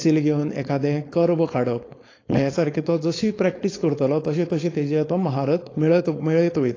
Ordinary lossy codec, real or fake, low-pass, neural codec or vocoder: AAC, 32 kbps; fake; 7.2 kHz; codec, 16 kHz, 4.8 kbps, FACodec